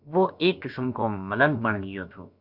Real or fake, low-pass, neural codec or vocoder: fake; 5.4 kHz; codec, 16 kHz, about 1 kbps, DyCAST, with the encoder's durations